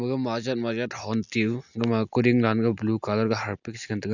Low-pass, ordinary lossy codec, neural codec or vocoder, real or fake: 7.2 kHz; none; none; real